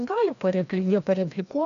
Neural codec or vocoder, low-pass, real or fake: codec, 16 kHz, 1 kbps, FreqCodec, larger model; 7.2 kHz; fake